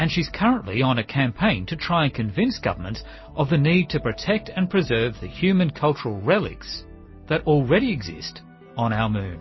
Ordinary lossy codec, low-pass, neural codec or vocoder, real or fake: MP3, 24 kbps; 7.2 kHz; none; real